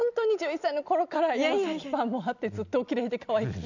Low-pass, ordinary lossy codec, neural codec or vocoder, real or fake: 7.2 kHz; none; none; real